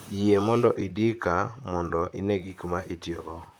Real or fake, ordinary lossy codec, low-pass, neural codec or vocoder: fake; none; none; vocoder, 44.1 kHz, 128 mel bands, Pupu-Vocoder